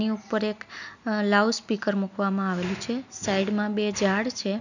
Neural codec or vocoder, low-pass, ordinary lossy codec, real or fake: none; 7.2 kHz; none; real